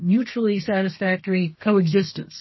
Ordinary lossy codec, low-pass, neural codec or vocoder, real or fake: MP3, 24 kbps; 7.2 kHz; codec, 32 kHz, 1.9 kbps, SNAC; fake